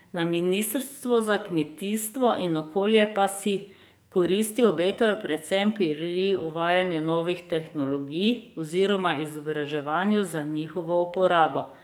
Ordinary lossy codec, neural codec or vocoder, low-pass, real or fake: none; codec, 44.1 kHz, 2.6 kbps, SNAC; none; fake